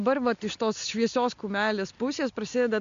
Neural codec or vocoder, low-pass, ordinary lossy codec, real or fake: none; 7.2 kHz; MP3, 64 kbps; real